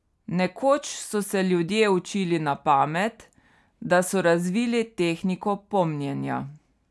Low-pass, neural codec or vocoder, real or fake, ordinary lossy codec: none; none; real; none